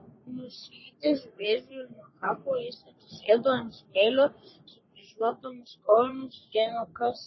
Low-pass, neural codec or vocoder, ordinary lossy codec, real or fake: 7.2 kHz; codec, 44.1 kHz, 3.4 kbps, Pupu-Codec; MP3, 24 kbps; fake